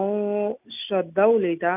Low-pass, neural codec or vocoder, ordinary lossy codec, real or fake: 3.6 kHz; none; none; real